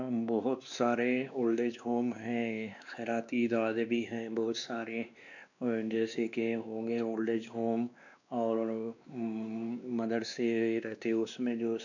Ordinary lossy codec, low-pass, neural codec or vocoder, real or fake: none; 7.2 kHz; codec, 16 kHz, 2 kbps, X-Codec, WavLM features, trained on Multilingual LibriSpeech; fake